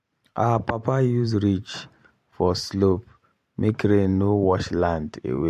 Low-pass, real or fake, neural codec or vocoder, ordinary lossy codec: 14.4 kHz; fake; vocoder, 44.1 kHz, 128 mel bands every 512 samples, BigVGAN v2; MP3, 64 kbps